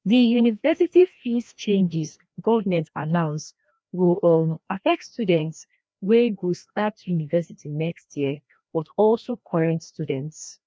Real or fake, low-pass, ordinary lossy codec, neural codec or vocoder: fake; none; none; codec, 16 kHz, 1 kbps, FreqCodec, larger model